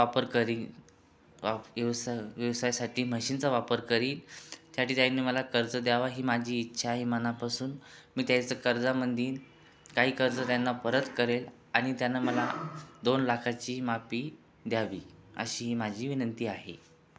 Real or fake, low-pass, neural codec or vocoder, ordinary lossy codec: real; none; none; none